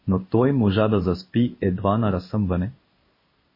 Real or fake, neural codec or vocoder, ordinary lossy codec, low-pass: fake; codec, 16 kHz in and 24 kHz out, 1 kbps, XY-Tokenizer; MP3, 24 kbps; 5.4 kHz